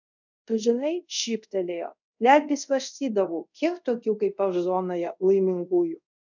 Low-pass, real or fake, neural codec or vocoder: 7.2 kHz; fake; codec, 24 kHz, 0.5 kbps, DualCodec